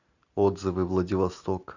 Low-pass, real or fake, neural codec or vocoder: 7.2 kHz; real; none